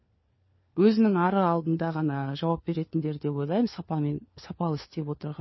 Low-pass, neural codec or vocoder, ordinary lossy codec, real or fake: 7.2 kHz; codec, 16 kHz, 4 kbps, FunCodec, trained on LibriTTS, 50 frames a second; MP3, 24 kbps; fake